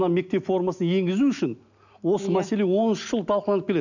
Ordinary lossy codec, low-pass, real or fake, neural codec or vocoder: none; 7.2 kHz; real; none